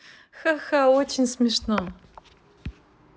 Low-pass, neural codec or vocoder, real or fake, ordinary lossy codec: none; none; real; none